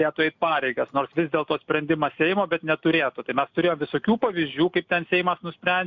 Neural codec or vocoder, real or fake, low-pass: none; real; 7.2 kHz